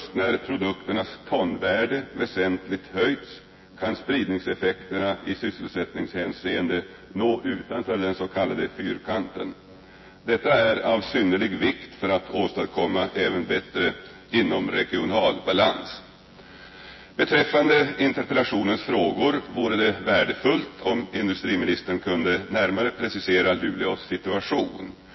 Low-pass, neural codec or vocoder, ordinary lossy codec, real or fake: 7.2 kHz; vocoder, 24 kHz, 100 mel bands, Vocos; MP3, 24 kbps; fake